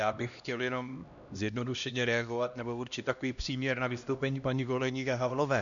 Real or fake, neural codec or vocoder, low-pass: fake; codec, 16 kHz, 1 kbps, X-Codec, HuBERT features, trained on LibriSpeech; 7.2 kHz